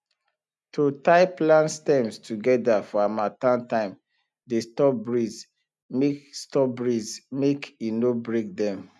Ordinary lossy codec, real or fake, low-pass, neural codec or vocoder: none; real; none; none